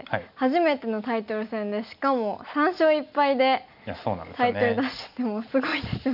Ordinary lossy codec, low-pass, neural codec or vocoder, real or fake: none; 5.4 kHz; none; real